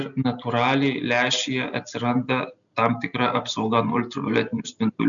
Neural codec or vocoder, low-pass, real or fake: none; 7.2 kHz; real